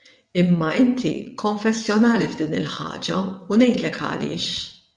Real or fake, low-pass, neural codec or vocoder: fake; 9.9 kHz; vocoder, 22.05 kHz, 80 mel bands, WaveNeXt